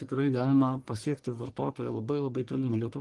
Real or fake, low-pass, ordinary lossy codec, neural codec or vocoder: fake; 10.8 kHz; Opus, 24 kbps; codec, 44.1 kHz, 1.7 kbps, Pupu-Codec